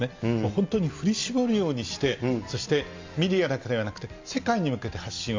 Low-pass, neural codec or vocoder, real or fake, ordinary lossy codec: 7.2 kHz; none; real; MP3, 64 kbps